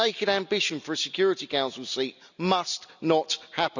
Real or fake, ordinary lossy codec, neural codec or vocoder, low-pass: real; none; none; 7.2 kHz